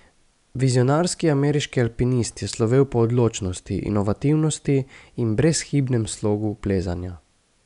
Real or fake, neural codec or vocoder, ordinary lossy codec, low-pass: real; none; none; 10.8 kHz